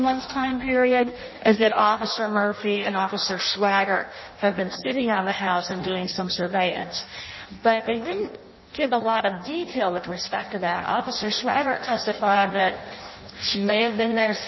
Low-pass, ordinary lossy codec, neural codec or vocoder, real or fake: 7.2 kHz; MP3, 24 kbps; codec, 16 kHz in and 24 kHz out, 0.6 kbps, FireRedTTS-2 codec; fake